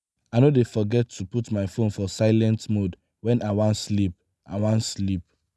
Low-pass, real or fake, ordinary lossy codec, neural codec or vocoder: none; real; none; none